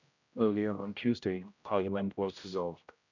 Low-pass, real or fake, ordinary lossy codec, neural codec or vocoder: 7.2 kHz; fake; none; codec, 16 kHz, 0.5 kbps, X-Codec, HuBERT features, trained on general audio